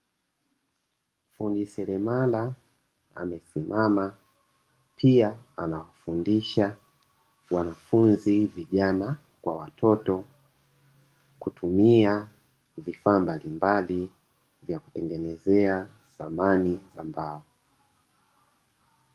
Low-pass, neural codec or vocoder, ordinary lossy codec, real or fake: 14.4 kHz; codec, 44.1 kHz, 7.8 kbps, DAC; Opus, 32 kbps; fake